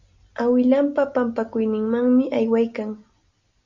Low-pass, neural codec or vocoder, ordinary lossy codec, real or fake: 7.2 kHz; none; Opus, 64 kbps; real